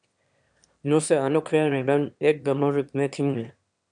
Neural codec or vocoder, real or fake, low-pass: autoencoder, 22.05 kHz, a latent of 192 numbers a frame, VITS, trained on one speaker; fake; 9.9 kHz